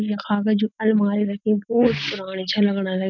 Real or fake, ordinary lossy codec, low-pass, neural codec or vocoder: real; none; 7.2 kHz; none